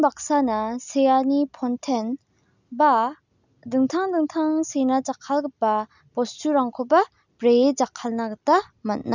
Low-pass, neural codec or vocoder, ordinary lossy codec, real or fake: 7.2 kHz; none; none; real